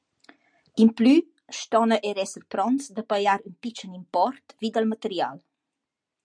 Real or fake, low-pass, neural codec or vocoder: real; 9.9 kHz; none